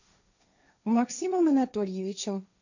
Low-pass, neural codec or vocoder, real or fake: 7.2 kHz; codec, 16 kHz, 1.1 kbps, Voila-Tokenizer; fake